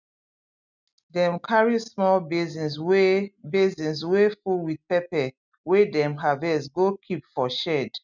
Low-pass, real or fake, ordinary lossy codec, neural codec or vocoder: 7.2 kHz; real; none; none